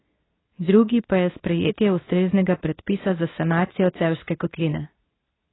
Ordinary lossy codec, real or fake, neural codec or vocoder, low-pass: AAC, 16 kbps; fake; codec, 24 kHz, 0.9 kbps, WavTokenizer, medium speech release version 2; 7.2 kHz